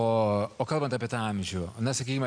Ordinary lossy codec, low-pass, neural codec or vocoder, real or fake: MP3, 96 kbps; 9.9 kHz; none; real